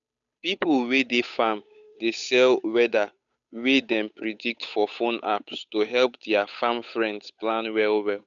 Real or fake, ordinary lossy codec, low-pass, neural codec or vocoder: fake; none; 7.2 kHz; codec, 16 kHz, 8 kbps, FunCodec, trained on Chinese and English, 25 frames a second